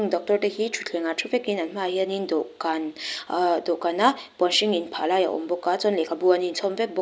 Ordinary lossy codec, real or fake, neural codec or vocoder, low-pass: none; real; none; none